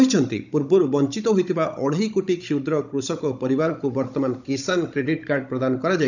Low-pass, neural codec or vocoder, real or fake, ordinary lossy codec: 7.2 kHz; codec, 16 kHz, 16 kbps, FunCodec, trained on Chinese and English, 50 frames a second; fake; none